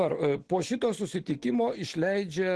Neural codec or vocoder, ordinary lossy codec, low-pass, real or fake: none; Opus, 16 kbps; 10.8 kHz; real